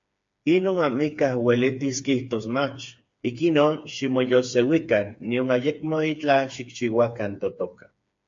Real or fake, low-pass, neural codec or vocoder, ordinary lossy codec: fake; 7.2 kHz; codec, 16 kHz, 4 kbps, FreqCodec, smaller model; AAC, 64 kbps